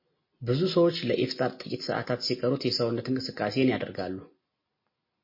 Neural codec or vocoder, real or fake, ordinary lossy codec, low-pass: none; real; MP3, 24 kbps; 5.4 kHz